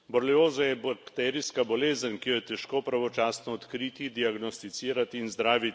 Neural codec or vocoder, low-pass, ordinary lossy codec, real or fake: none; none; none; real